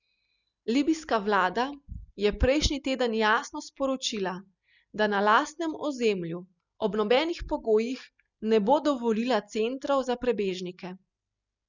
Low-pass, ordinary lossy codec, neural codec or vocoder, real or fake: 7.2 kHz; none; none; real